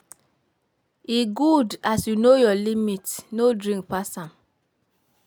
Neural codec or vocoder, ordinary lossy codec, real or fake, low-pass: none; none; real; none